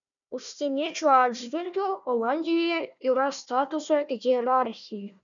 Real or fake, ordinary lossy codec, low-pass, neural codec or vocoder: fake; MP3, 96 kbps; 7.2 kHz; codec, 16 kHz, 1 kbps, FunCodec, trained on Chinese and English, 50 frames a second